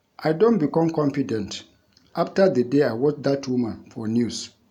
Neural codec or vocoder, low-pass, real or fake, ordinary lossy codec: none; 19.8 kHz; real; none